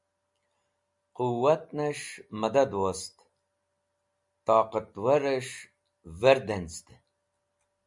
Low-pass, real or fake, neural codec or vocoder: 10.8 kHz; real; none